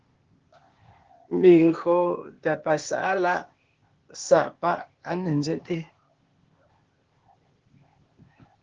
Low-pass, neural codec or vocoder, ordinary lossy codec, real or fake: 7.2 kHz; codec, 16 kHz, 0.8 kbps, ZipCodec; Opus, 16 kbps; fake